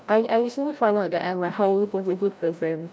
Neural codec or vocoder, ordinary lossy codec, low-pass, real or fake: codec, 16 kHz, 0.5 kbps, FreqCodec, larger model; none; none; fake